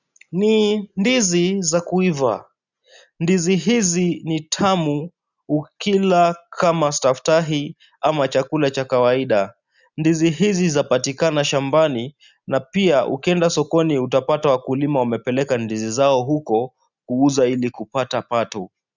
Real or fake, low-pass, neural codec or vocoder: real; 7.2 kHz; none